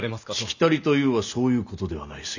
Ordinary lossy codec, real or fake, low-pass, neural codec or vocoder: none; real; 7.2 kHz; none